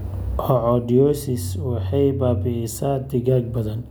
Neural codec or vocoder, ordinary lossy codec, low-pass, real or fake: none; none; none; real